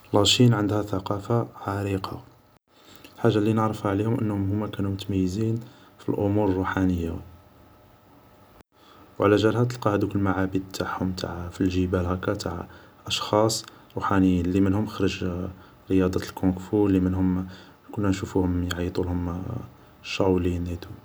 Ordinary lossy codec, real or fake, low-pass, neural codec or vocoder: none; real; none; none